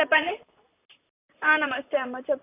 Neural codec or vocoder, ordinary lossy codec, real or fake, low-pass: vocoder, 44.1 kHz, 128 mel bands, Pupu-Vocoder; AAC, 24 kbps; fake; 3.6 kHz